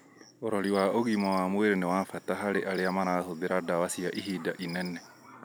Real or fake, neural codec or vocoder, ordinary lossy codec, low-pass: real; none; none; none